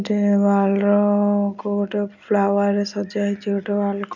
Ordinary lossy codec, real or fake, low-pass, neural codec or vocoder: none; real; 7.2 kHz; none